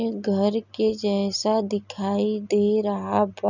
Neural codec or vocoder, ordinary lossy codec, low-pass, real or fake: none; none; 7.2 kHz; real